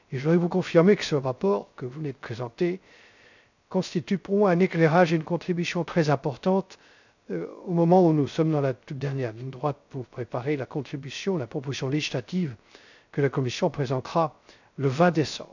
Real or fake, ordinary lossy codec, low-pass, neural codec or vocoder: fake; none; 7.2 kHz; codec, 16 kHz, 0.3 kbps, FocalCodec